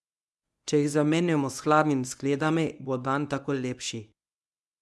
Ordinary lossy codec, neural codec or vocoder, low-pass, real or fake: none; codec, 24 kHz, 0.9 kbps, WavTokenizer, medium speech release version 1; none; fake